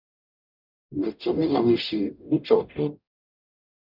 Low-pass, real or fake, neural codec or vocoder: 5.4 kHz; fake; codec, 44.1 kHz, 0.9 kbps, DAC